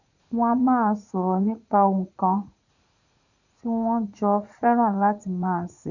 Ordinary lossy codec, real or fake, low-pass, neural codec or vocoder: none; fake; 7.2 kHz; vocoder, 44.1 kHz, 80 mel bands, Vocos